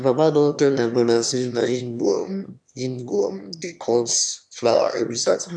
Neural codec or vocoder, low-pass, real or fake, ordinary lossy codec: autoencoder, 22.05 kHz, a latent of 192 numbers a frame, VITS, trained on one speaker; 9.9 kHz; fake; none